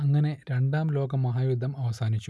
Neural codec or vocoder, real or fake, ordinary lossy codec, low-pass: none; real; none; none